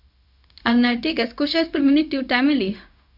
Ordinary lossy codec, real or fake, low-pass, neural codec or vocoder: none; fake; 5.4 kHz; codec, 16 kHz, 0.4 kbps, LongCat-Audio-Codec